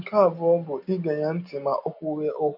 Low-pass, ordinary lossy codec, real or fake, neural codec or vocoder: 5.4 kHz; none; real; none